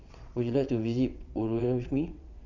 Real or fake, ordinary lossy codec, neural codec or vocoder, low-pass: fake; none; vocoder, 22.05 kHz, 80 mel bands, WaveNeXt; 7.2 kHz